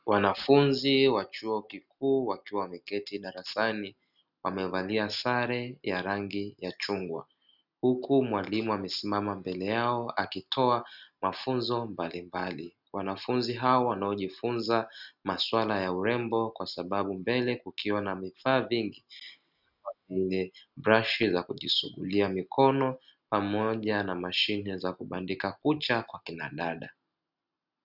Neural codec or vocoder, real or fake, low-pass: none; real; 5.4 kHz